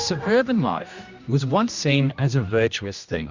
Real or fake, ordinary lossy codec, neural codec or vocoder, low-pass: fake; Opus, 64 kbps; codec, 16 kHz, 1 kbps, X-Codec, HuBERT features, trained on general audio; 7.2 kHz